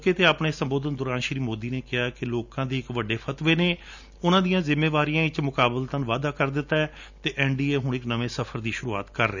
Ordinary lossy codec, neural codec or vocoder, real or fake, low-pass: none; none; real; 7.2 kHz